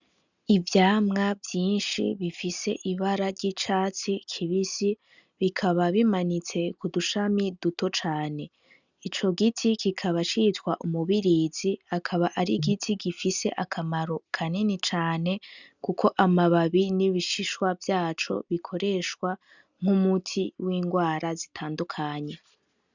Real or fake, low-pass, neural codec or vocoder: real; 7.2 kHz; none